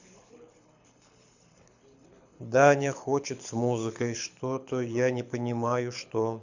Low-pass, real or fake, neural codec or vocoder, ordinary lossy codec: 7.2 kHz; fake; codec, 24 kHz, 6 kbps, HILCodec; none